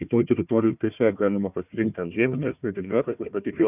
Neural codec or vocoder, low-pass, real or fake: codec, 16 kHz, 1 kbps, FunCodec, trained on Chinese and English, 50 frames a second; 3.6 kHz; fake